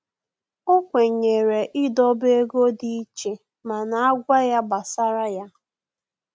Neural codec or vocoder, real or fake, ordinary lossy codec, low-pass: none; real; none; none